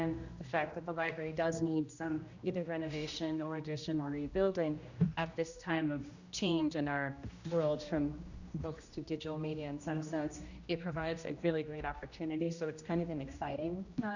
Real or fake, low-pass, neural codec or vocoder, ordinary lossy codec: fake; 7.2 kHz; codec, 16 kHz, 1 kbps, X-Codec, HuBERT features, trained on general audio; Opus, 64 kbps